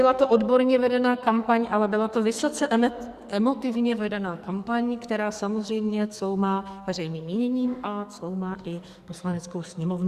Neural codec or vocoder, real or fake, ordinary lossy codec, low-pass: codec, 44.1 kHz, 2.6 kbps, SNAC; fake; Opus, 64 kbps; 14.4 kHz